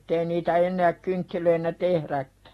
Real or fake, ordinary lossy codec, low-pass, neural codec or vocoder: real; AAC, 32 kbps; 19.8 kHz; none